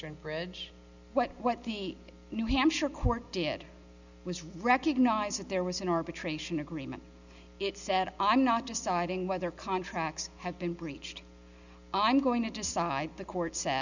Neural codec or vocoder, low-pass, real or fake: none; 7.2 kHz; real